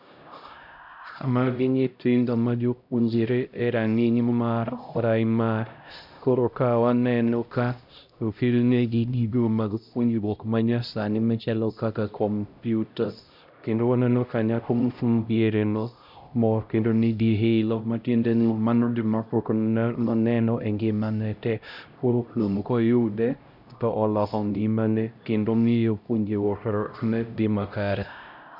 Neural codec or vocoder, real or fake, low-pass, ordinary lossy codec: codec, 16 kHz, 0.5 kbps, X-Codec, HuBERT features, trained on LibriSpeech; fake; 5.4 kHz; none